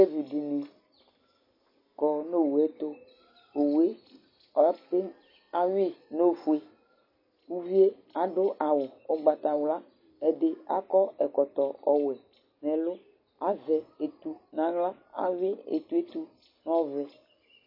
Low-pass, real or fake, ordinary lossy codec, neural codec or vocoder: 5.4 kHz; real; MP3, 24 kbps; none